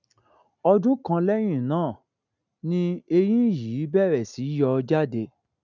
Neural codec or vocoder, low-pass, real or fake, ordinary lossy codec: none; 7.2 kHz; real; none